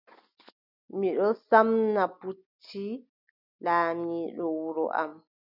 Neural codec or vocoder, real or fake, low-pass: none; real; 5.4 kHz